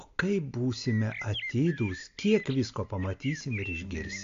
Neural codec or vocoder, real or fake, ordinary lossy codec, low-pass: none; real; AAC, 48 kbps; 7.2 kHz